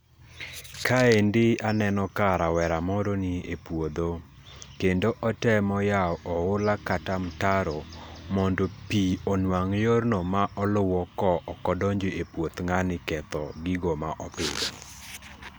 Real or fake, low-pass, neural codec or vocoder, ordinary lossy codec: real; none; none; none